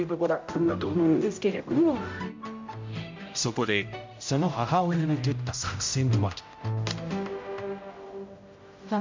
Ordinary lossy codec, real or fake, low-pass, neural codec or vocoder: MP3, 64 kbps; fake; 7.2 kHz; codec, 16 kHz, 0.5 kbps, X-Codec, HuBERT features, trained on general audio